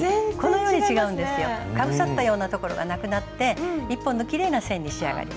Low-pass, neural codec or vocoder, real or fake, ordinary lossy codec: none; none; real; none